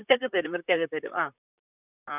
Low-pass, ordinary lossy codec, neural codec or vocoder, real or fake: 3.6 kHz; none; vocoder, 44.1 kHz, 128 mel bands, Pupu-Vocoder; fake